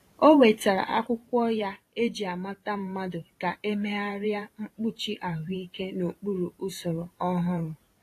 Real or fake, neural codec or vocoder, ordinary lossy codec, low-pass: fake; vocoder, 44.1 kHz, 128 mel bands every 512 samples, BigVGAN v2; AAC, 48 kbps; 14.4 kHz